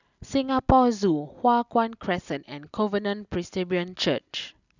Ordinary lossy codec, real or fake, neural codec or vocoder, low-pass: none; real; none; 7.2 kHz